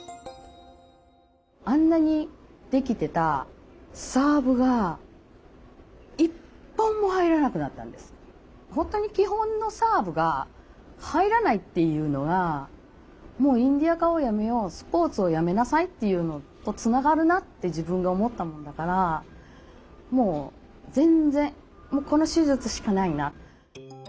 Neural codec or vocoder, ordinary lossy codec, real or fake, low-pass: none; none; real; none